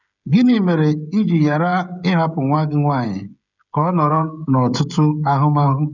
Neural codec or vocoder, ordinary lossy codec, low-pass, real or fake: codec, 16 kHz, 16 kbps, FreqCodec, smaller model; none; 7.2 kHz; fake